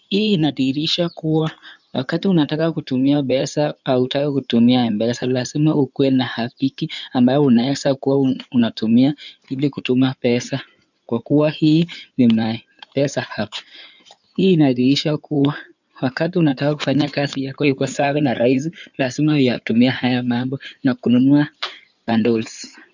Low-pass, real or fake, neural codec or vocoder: 7.2 kHz; fake; codec, 16 kHz in and 24 kHz out, 2.2 kbps, FireRedTTS-2 codec